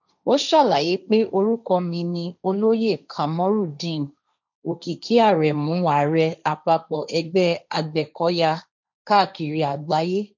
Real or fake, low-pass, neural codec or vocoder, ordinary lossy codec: fake; 7.2 kHz; codec, 16 kHz, 1.1 kbps, Voila-Tokenizer; none